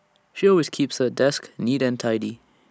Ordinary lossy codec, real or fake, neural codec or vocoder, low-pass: none; real; none; none